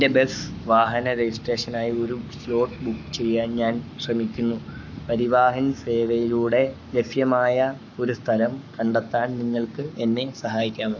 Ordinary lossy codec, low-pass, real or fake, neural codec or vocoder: none; 7.2 kHz; fake; codec, 44.1 kHz, 7.8 kbps, Pupu-Codec